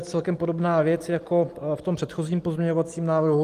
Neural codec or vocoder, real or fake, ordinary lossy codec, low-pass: none; real; Opus, 24 kbps; 14.4 kHz